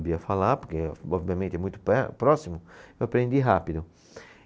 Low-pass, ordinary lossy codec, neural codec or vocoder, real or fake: none; none; none; real